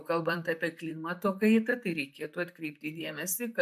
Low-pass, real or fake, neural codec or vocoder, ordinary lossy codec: 14.4 kHz; fake; vocoder, 44.1 kHz, 128 mel bands, Pupu-Vocoder; AAC, 96 kbps